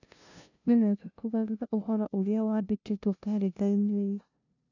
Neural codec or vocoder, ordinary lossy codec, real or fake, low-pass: codec, 16 kHz, 0.5 kbps, FunCodec, trained on LibriTTS, 25 frames a second; none; fake; 7.2 kHz